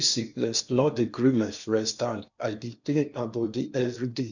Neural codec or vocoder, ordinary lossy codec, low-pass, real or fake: codec, 16 kHz in and 24 kHz out, 0.8 kbps, FocalCodec, streaming, 65536 codes; none; 7.2 kHz; fake